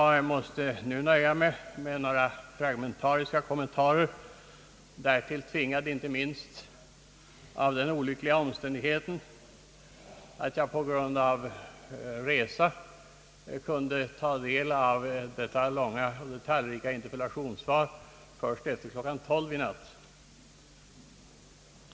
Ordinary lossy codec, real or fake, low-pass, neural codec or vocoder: none; real; none; none